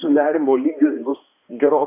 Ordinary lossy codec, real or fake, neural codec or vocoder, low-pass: AAC, 24 kbps; fake; codec, 16 kHz, 2 kbps, X-Codec, HuBERT features, trained on balanced general audio; 3.6 kHz